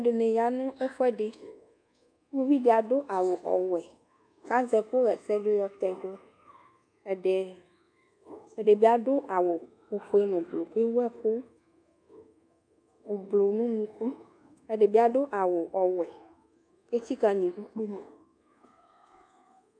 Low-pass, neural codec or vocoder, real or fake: 9.9 kHz; codec, 24 kHz, 1.2 kbps, DualCodec; fake